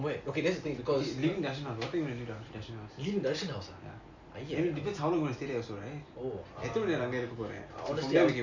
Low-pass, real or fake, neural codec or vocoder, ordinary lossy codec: 7.2 kHz; real; none; Opus, 64 kbps